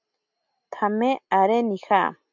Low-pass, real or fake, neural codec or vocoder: 7.2 kHz; real; none